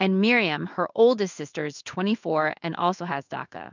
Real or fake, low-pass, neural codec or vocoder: fake; 7.2 kHz; codec, 16 kHz in and 24 kHz out, 1 kbps, XY-Tokenizer